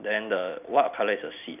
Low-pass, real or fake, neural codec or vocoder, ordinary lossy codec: 3.6 kHz; real; none; none